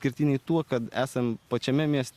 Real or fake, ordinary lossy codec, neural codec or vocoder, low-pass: real; Opus, 64 kbps; none; 14.4 kHz